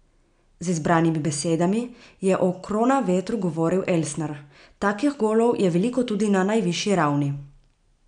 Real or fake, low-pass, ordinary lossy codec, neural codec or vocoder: real; 9.9 kHz; none; none